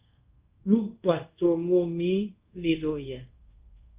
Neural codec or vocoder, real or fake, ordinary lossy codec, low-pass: codec, 24 kHz, 0.5 kbps, DualCodec; fake; Opus, 24 kbps; 3.6 kHz